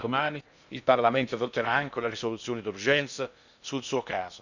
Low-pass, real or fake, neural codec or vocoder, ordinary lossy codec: 7.2 kHz; fake; codec, 16 kHz in and 24 kHz out, 0.6 kbps, FocalCodec, streaming, 2048 codes; none